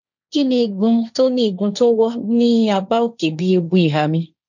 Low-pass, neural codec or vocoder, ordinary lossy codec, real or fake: 7.2 kHz; codec, 16 kHz, 1.1 kbps, Voila-Tokenizer; none; fake